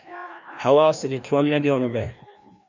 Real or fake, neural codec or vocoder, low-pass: fake; codec, 16 kHz, 1 kbps, FreqCodec, larger model; 7.2 kHz